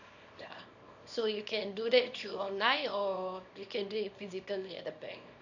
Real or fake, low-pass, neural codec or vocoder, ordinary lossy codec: fake; 7.2 kHz; codec, 24 kHz, 0.9 kbps, WavTokenizer, small release; none